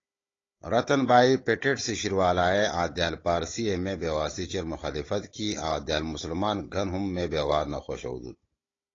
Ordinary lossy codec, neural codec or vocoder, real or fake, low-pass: AAC, 32 kbps; codec, 16 kHz, 16 kbps, FunCodec, trained on Chinese and English, 50 frames a second; fake; 7.2 kHz